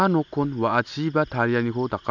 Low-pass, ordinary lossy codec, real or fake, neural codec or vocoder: 7.2 kHz; none; real; none